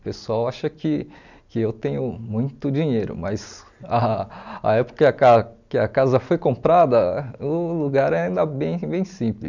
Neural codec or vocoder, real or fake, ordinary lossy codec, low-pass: none; real; none; 7.2 kHz